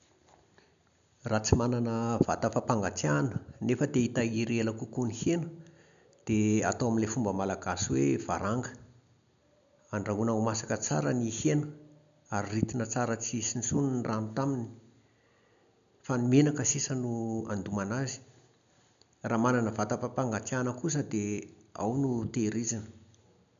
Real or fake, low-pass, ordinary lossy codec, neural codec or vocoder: real; 7.2 kHz; none; none